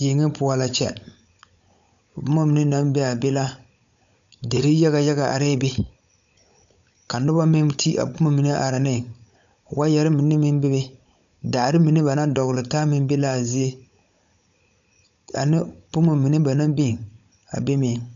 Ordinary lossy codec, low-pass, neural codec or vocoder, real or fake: MP3, 64 kbps; 7.2 kHz; codec, 16 kHz, 16 kbps, FunCodec, trained on Chinese and English, 50 frames a second; fake